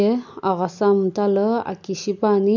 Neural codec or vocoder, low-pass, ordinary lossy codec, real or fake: none; 7.2 kHz; none; real